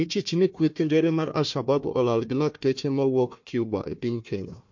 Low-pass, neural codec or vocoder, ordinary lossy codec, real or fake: 7.2 kHz; codec, 16 kHz, 1 kbps, FunCodec, trained on Chinese and English, 50 frames a second; MP3, 48 kbps; fake